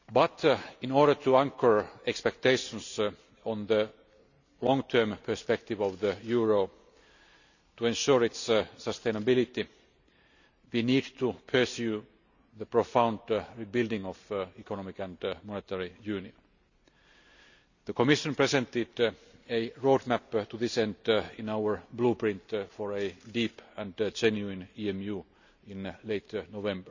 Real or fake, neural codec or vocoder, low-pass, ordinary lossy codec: real; none; 7.2 kHz; none